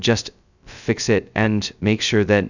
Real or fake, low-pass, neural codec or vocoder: fake; 7.2 kHz; codec, 16 kHz, 0.2 kbps, FocalCodec